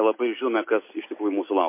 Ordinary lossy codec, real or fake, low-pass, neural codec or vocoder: MP3, 24 kbps; real; 3.6 kHz; none